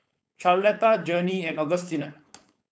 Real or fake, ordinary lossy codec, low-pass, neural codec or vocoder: fake; none; none; codec, 16 kHz, 4.8 kbps, FACodec